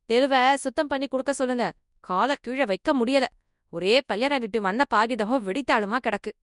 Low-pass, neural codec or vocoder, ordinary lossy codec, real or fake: 10.8 kHz; codec, 24 kHz, 0.9 kbps, WavTokenizer, large speech release; none; fake